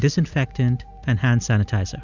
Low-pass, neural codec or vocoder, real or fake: 7.2 kHz; none; real